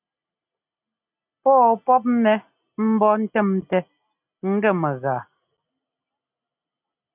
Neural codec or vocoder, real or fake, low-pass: none; real; 3.6 kHz